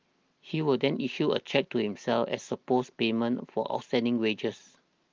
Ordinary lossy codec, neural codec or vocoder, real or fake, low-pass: Opus, 32 kbps; none; real; 7.2 kHz